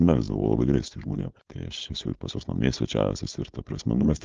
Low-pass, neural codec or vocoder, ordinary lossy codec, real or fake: 7.2 kHz; codec, 16 kHz, 4.8 kbps, FACodec; Opus, 24 kbps; fake